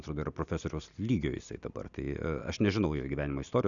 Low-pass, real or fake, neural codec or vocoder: 7.2 kHz; real; none